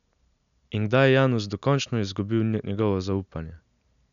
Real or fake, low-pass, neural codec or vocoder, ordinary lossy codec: real; 7.2 kHz; none; none